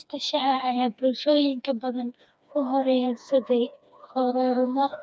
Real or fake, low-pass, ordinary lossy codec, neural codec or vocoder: fake; none; none; codec, 16 kHz, 2 kbps, FreqCodec, smaller model